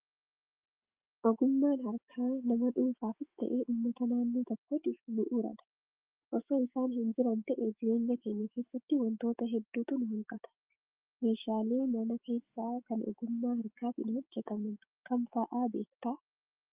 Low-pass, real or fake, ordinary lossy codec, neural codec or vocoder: 3.6 kHz; fake; Opus, 24 kbps; autoencoder, 48 kHz, 128 numbers a frame, DAC-VAE, trained on Japanese speech